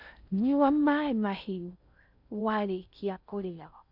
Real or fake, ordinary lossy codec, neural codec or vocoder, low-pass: fake; none; codec, 16 kHz in and 24 kHz out, 0.6 kbps, FocalCodec, streaming, 4096 codes; 5.4 kHz